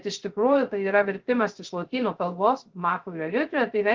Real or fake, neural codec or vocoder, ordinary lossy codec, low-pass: fake; codec, 16 kHz, 0.3 kbps, FocalCodec; Opus, 16 kbps; 7.2 kHz